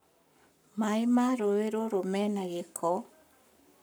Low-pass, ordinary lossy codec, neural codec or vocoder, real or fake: none; none; codec, 44.1 kHz, 7.8 kbps, Pupu-Codec; fake